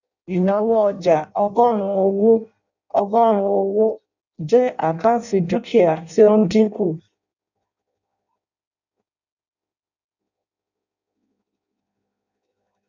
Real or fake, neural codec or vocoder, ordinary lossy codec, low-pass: fake; codec, 16 kHz in and 24 kHz out, 0.6 kbps, FireRedTTS-2 codec; none; 7.2 kHz